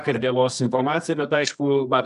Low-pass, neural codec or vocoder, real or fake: 10.8 kHz; codec, 24 kHz, 0.9 kbps, WavTokenizer, medium music audio release; fake